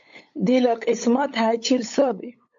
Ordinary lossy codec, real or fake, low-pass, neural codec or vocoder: MP3, 48 kbps; fake; 7.2 kHz; codec, 16 kHz, 8 kbps, FunCodec, trained on LibriTTS, 25 frames a second